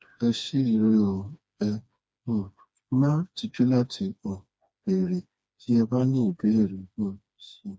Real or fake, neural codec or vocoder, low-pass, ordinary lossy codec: fake; codec, 16 kHz, 2 kbps, FreqCodec, smaller model; none; none